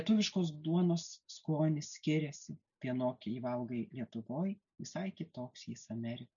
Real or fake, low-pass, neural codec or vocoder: real; 7.2 kHz; none